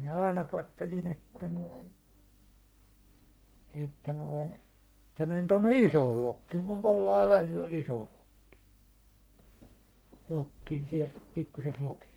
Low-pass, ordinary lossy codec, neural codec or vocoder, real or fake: none; none; codec, 44.1 kHz, 1.7 kbps, Pupu-Codec; fake